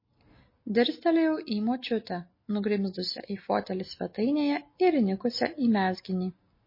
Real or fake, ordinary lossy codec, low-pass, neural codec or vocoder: real; MP3, 24 kbps; 5.4 kHz; none